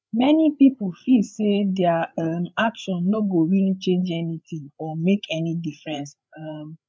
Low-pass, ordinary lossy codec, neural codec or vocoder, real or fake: none; none; codec, 16 kHz, 8 kbps, FreqCodec, larger model; fake